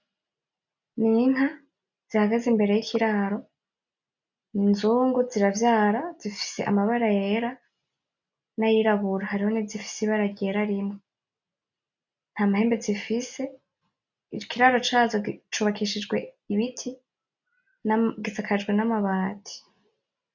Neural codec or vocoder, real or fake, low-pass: none; real; 7.2 kHz